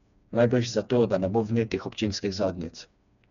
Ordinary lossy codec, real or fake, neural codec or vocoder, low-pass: none; fake; codec, 16 kHz, 2 kbps, FreqCodec, smaller model; 7.2 kHz